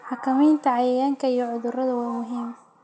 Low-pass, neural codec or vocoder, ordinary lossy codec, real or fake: none; none; none; real